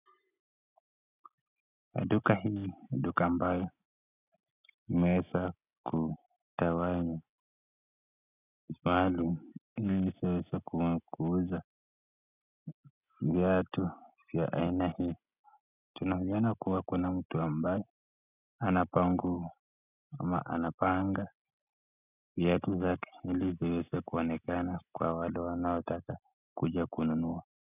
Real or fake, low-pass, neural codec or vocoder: real; 3.6 kHz; none